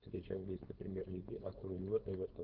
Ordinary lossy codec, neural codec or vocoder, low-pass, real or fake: Opus, 16 kbps; codec, 16 kHz, 4.8 kbps, FACodec; 5.4 kHz; fake